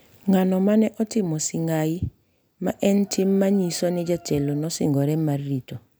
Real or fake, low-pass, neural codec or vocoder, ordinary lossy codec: real; none; none; none